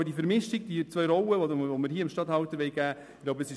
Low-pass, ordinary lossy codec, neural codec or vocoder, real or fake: none; none; none; real